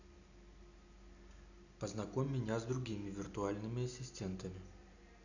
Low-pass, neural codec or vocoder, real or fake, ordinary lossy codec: 7.2 kHz; none; real; none